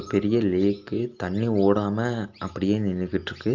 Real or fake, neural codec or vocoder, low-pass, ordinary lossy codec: real; none; 7.2 kHz; Opus, 24 kbps